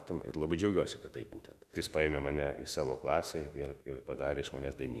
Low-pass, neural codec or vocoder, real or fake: 14.4 kHz; autoencoder, 48 kHz, 32 numbers a frame, DAC-VAE, trained on Japanese speech; fake